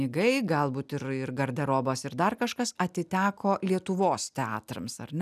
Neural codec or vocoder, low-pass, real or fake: none; 14.4 kHz; real